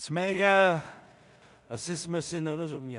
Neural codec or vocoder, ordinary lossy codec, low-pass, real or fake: codec, 16 kHz in and 24 kHz out, 0.4 kbps, LongCat-Audio-Codec, two codebook decoder; MP3, 96 kbps; 10.8 kHz; fake